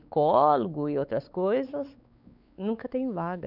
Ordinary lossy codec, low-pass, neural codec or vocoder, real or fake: none; 5.4 kHz; codec, 16 kHz, 4 kbps, X-Codec, WavLM features, trained on Multilingual LibriSpeech; fake